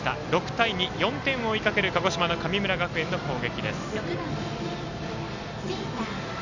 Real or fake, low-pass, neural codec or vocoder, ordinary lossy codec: real; 7.2 kHz; none; none